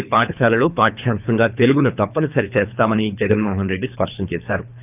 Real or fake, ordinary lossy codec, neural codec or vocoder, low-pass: fake; none; codec, 24 kHz, 3 kbps, HILCodec; 3.6 kHz